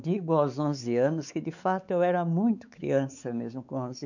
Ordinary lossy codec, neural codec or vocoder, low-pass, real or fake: none; codec, 16 kHz, 4 kbps, X-Codec, WavLM features, trained on Multilingual LibriSpeech; 7.2 kHz; fake